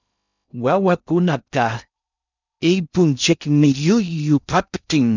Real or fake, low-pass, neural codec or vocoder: fake; 7.2 kHz; codec, 16 kHz in and 24 kHz out, 0.6 kbps, FocalCodec, streaming, 2048 codes